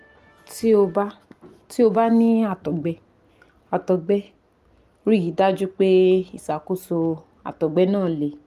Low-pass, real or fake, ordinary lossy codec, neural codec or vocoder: 14.4 kHz; real; Opus, 32 kbps; none